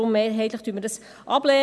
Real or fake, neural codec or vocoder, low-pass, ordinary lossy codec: real; none; none; none